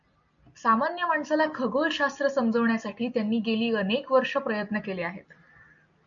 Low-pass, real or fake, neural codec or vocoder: 7.2 kHz; real; none